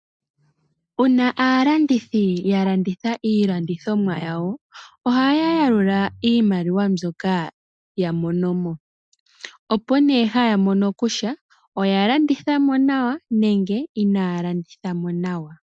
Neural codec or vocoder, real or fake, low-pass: none; real; 9.9 kHz